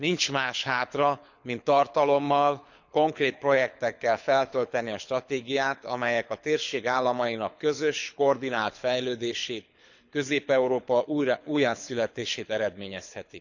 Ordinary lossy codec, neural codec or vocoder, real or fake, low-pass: none; codec, 24 kHz, 6 kbps, HILCodec; fake; 7.2 kHz